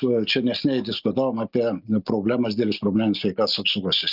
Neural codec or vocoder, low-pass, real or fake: none; 5.4 kHz; real